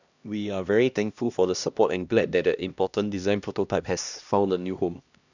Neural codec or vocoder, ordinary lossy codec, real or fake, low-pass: codec, 16 kHz, 1 kbps, X-Codec, HuBERT features, trained on LibriSpeech; none; fake; 7.2 kHz